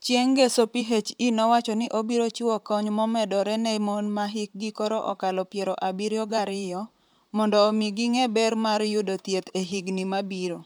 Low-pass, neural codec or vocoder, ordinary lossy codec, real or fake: none; vocoder, 44.1 kHz, 128 mel bands, Pupu-Vocoder; none; fake